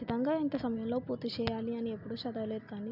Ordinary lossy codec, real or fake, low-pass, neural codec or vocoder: none; real; 5.4 kHz; none